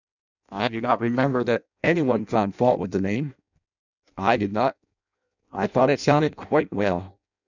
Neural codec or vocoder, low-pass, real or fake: codec, 16 kHz in and 24 kHz out, 0.6 kbps, FireRedTTS-2 codec; 7.2 kHz; fake